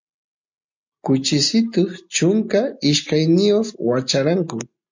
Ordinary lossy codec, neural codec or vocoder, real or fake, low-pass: MP3, 48 kbps; none; real; 7.2 kHz